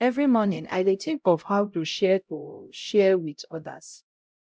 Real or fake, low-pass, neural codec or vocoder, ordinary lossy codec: fake; none; codec, 16 kHz, 0.5 kbps, X-Codec, HuBERT features, trained on LibriSpeech; none